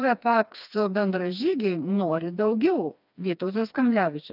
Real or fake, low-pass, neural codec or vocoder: fake; 5.4 kHz; codec, 16 kHz, 2 kbps, FreqCodec, smaller model